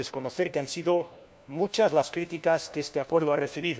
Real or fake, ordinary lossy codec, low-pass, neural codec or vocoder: fake; none; none; codec, 16 kHz, 1 kbps, FunCodec, trained on LibriTTS, 50 frames a second